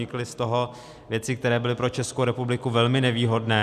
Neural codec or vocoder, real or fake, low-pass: none; real; 14.4 kHz